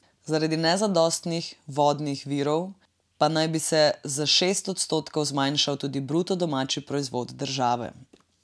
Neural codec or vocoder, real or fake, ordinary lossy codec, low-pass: none; real; none; none